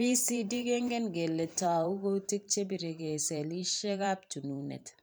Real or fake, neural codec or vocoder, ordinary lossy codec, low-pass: fake; vocoder, 44.1 kHz, 128 mel bands every 512 samples, BigVGAN v2; none; none